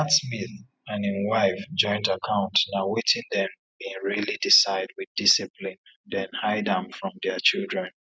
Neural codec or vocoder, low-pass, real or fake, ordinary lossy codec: none; none; real; none